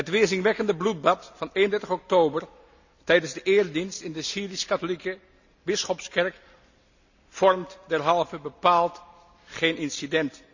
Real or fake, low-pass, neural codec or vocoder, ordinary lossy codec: real; 7.2 kHz; none; none